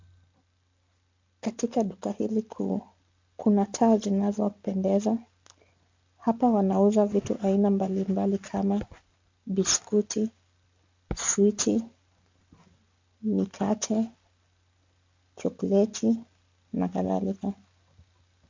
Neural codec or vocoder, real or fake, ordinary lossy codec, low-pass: none; real; MP3, 48 kbps; 7.2 kHz